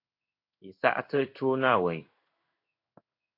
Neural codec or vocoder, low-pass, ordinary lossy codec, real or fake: codec, 16 kHz in and 24 kHz out, 1 kbps, XY-Tokenizer; 5.4 kHz; AAC, 32 kbps; fake